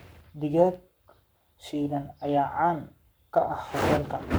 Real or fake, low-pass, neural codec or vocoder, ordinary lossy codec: fake; none; codec, 44.1 kHz, 7.8 kbps, Pupu-Codec; none